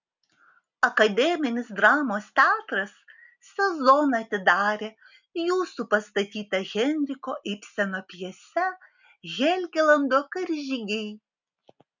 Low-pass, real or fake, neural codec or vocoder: 7.2 kHz; real; none